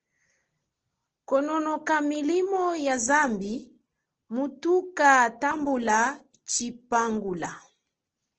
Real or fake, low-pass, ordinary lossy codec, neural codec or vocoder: real; 7.2 kHz; Opus, 16 kbps; none